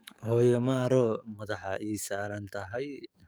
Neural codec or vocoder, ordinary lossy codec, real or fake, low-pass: codec, 44.1 kHz, 7.8 kbps, DAC; none; fake; none